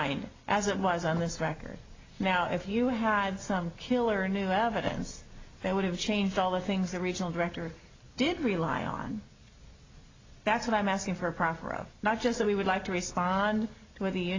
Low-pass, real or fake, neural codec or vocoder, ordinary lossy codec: 7.2 kHz; real; none; AAC, 32 kbps